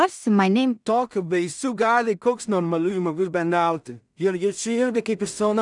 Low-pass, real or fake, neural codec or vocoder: 10.8 kHz; fake; codec, 16 kHz in and 24 kHz out, 0.4 kbps, LongCat-Audio-Codec, two codebook decoder